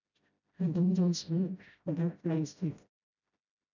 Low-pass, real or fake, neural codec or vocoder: 7.2 kHz; fake; codec, 16 kHz, 0.5 kbps, FreqCodec, smaller model